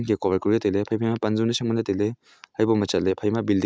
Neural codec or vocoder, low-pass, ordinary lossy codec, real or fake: none; none; none; real